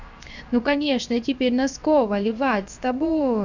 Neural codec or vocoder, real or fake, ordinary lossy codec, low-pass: codec, 16 kHz, 0.7 kbps, FocalCodec; fake; none; 7.2 kHz